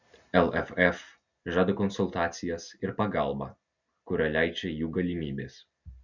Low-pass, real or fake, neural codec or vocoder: 7.2 kHz; real; none